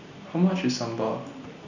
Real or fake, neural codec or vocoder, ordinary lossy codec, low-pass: real; none; none; 7.2 kHz